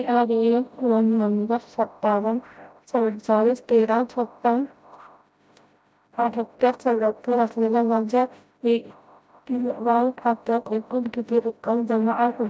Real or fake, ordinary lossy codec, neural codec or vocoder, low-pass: fake; none; codec, 16 kHz, 0.5 kbps, FreqCodec, smaller model; none